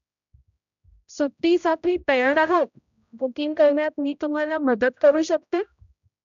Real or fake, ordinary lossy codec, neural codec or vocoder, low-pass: fake; none; codec, 16 kHz, 0.5 kbps, X-Codec, HuBERT features, trained on general audio; 7.2 kHz